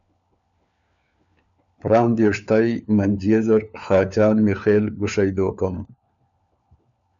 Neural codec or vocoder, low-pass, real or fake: codec, 16 kHz, 4 kbps, FunCodec, trained on LibriTTS, 50 frames a second; 7.2 kHz; fake